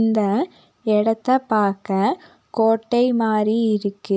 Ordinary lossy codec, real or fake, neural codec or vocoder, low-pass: none; real; none; none